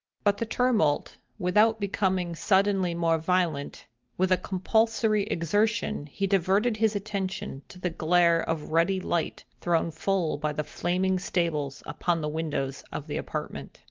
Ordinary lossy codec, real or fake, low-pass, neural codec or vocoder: Opus, 32 kbps; real; 7.2 kHz; none